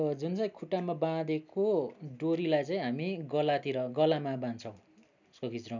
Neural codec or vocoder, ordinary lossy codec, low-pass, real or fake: none; none; 7.2 kHz; real